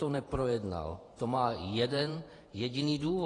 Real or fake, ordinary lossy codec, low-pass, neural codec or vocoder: fake; AAC, 32 kbps; 10.8 kHz; vocoder, 48 kHz, 128 mel bands, Vocos